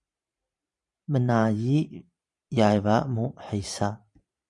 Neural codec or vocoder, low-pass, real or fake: vocoder, 44.1 kHz, 128 mel bands every 512 samples, BigVGAN v2; 10.8 kHz; fake